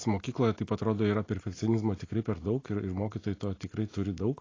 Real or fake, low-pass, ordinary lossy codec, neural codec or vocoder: real; 7.2 kHz; AAC, 32 kbps; none